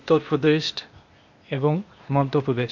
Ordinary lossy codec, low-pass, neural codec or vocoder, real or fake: MP3, 48 kbps; 7.2 kHz; codec, 16 kHz, 0.8 kbps, ZipCodec; fake